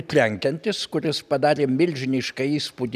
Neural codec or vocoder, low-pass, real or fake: none; 14.4 kHz; real